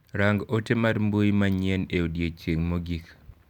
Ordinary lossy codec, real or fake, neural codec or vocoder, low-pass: none; real; none; 19.8 kHz